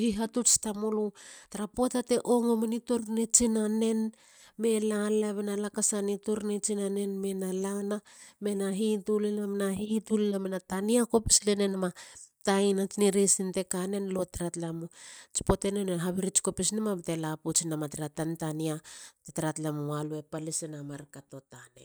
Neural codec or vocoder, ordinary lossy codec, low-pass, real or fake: none; none; none; real